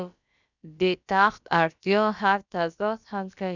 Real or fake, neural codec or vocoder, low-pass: fake; codec, 16 kHz, about 1 kbps, DyCAST, with the encoder's durations; 7.2 kHz